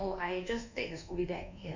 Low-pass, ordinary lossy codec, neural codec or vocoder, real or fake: 7.2 kHz; none; codec, 24 kHz, 1.2 kbps, DualCodec; fake